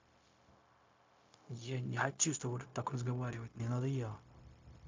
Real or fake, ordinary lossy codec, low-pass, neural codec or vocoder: fake; MP3, 48 kbps; 7.2 kHz; codec, 16 kHz, 0.4 kbps, LongCat-Audio-Codec